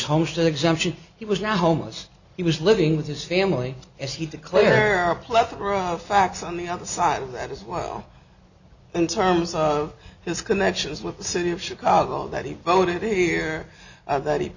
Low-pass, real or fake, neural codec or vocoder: 7.2 kHz; real; none